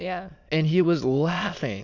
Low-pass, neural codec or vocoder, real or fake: 7.2 kHz; autoencoder, 22.05 kHz, a latent of 192 numbers a frame, VITS, trained on many speakers; fake